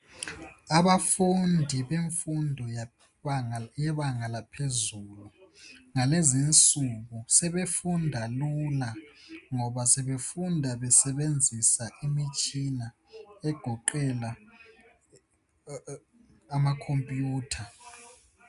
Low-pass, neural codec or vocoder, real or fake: 10.8 kHz; none; real